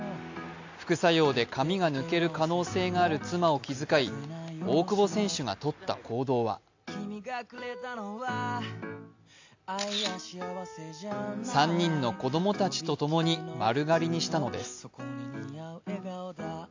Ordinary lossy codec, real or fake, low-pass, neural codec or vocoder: AAC, 48 kbps; real; 7.2 kHz; none